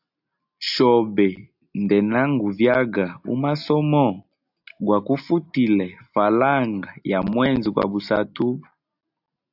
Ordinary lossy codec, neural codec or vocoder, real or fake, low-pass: AAC, 48 kbps; none; real; 5.4 kHz